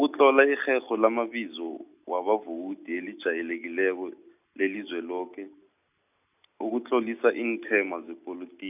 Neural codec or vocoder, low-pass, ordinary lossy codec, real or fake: none; 3.6 kHz; none; real